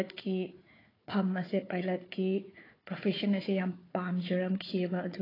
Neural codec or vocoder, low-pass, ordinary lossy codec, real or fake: codec, 16 kHz, 4 kbps, FunCodec, trained on Chinese and English, 50 frames a second; 5.4 kHz; AAC, 24 kbps; fake